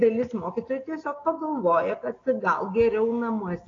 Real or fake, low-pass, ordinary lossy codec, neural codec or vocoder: real; 7.2 kHz; AAC, 32 kbps; none